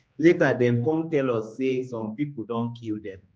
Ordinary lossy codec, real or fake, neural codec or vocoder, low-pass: none; fake; codec, 16 kHz, 2 kbps, X-Codec, HuBERT features, trained on general audio; none